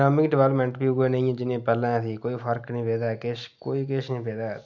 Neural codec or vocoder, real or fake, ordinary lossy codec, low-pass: none; real; none; 7.2 kHz